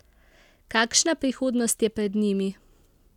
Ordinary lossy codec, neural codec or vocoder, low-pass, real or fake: none; none; 19.8 kHz; real